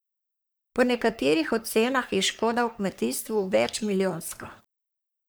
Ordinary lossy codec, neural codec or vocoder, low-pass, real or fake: none; codec, 44.1 kHz, 3.4 kbps, Pupu-Codec; none; fake